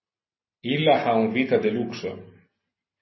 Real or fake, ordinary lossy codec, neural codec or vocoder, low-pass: real; MP3, 24 kbps; none; 7.2 kHz